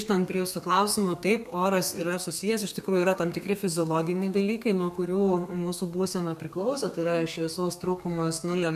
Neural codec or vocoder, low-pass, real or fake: codec, 32 kHz, 1.9 kbps, SNAC; 14.4 kHz; fake